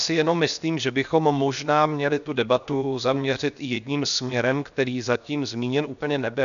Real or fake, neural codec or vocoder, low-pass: fake; codec, 16 kHz, 0.7 kbps, FocalCodec; 7.2 kHz